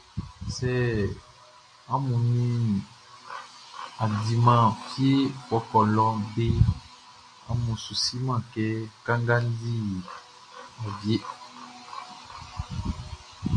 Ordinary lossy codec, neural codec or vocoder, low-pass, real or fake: AAC, 48 kbps; none; 9.9 kHz; real